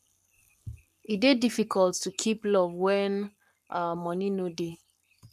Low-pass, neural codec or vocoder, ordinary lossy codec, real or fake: 14.4 kHz; codec, 44.1 kHz, 7.8 kbps, Pupu-Codec; none; fake